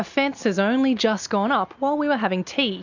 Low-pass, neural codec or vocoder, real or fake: 7.2 kHz; none; real